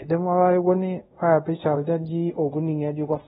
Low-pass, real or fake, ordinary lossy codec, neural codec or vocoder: 10.8 kHz; fake; AAC, 16 kbps; codec, 24 kHz, 0.5 kbps, DualCodec